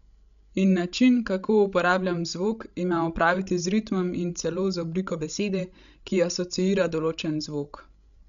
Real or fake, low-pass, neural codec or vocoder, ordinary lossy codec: fake; 7.2 kHz; codec, 16 kHz, 16 kbps, FreqCodec, larger model; none